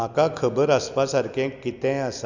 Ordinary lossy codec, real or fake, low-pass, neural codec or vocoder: none; real; 7.2 kHz; none